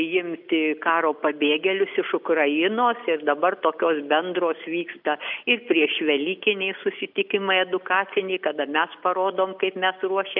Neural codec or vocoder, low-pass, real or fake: none; 5.4 kHz; real